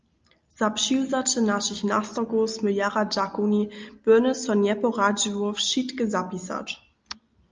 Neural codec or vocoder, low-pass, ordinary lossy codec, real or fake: none; 7.2 kHz; Opus, 24 kbps; real